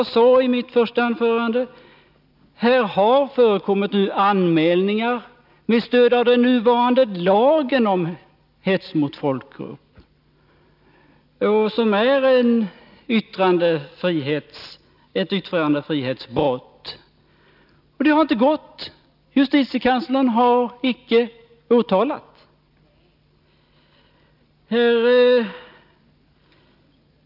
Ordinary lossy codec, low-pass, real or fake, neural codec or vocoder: none; 5.4 kHz; real; none